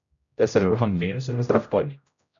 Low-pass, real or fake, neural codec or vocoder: 7.2 kHz; fake; codec, 16 kHz, 0.5 kbps, X-Codec, HuBERT features, trained on general audio